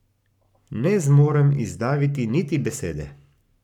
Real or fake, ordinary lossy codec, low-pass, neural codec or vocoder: fake; none; 19.8 kHz; codec, 44.1 kHz, 7.8 kbps, Pupu-Codec